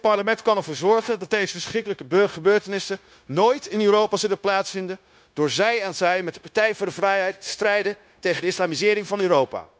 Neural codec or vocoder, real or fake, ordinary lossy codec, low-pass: codec, 16 kHz, 0.9 kbps, LongCat-Audio-Codec; fake; none; none